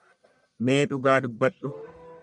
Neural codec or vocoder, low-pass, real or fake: codec, 44.1 kHz, 1.7 kbps, Pupu-Codec; 10.8 kHz; fake